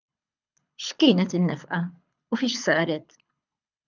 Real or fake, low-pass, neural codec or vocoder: fake; 7.2 kHz; codec, 24 kHz, 6 kbps, HILCodec